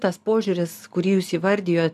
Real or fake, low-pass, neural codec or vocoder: real; 14.4 kHz; none